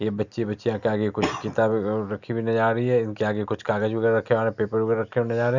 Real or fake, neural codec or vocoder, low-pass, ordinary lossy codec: real; none; 7.2 kHz; none